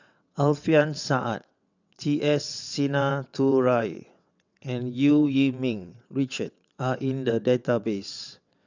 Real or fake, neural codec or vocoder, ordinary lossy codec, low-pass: fake; vocoder, 22.05 kHz, 80 mel bands, WaveNeXt; none; 7.2 kHz